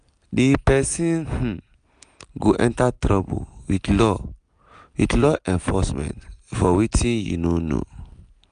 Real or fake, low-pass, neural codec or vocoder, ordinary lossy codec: real; 9.9 kHz; none; none